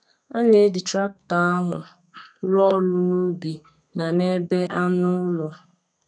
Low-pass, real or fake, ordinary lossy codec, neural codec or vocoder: 9.9 kHz; fake; none; codec, 32 kHz, 1.9 kbps, SNAC